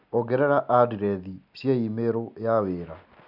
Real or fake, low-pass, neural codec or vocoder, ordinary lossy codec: real; 5.4 kHz; none; none